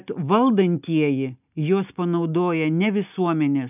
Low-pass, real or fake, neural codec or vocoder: 3.6 kHz; real; none